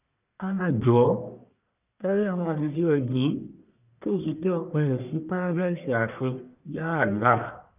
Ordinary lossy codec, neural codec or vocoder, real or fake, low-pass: none; codec, 44.1 kHz, 1.7 kbps, Pupu-Codec; fake; 3.6 kHz